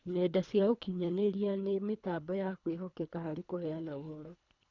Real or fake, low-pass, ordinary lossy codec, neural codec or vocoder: fake; 7.2 kHz; none; codec, 24 kHz, 3 kbps, HILCodec